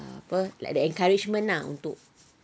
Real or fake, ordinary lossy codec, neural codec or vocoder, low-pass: real; none; none; none